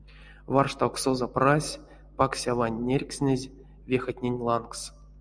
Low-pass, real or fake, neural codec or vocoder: 9.9 kHz; real; none